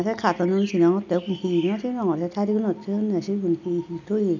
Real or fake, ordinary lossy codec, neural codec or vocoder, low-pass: real; none; none; 7.2 kHz